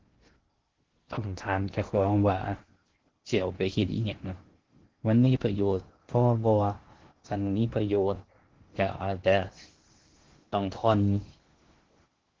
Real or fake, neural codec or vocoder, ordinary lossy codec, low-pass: fake; codec, 16 kHz in and 24 kHz out, 0.6 kbps, FocalCodec, streaming, 2048 codes; Opus, 16 kbps; 7.2 kHz